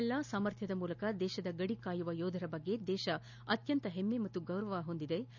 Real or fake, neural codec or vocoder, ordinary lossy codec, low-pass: real; none; none; 7.2 kHz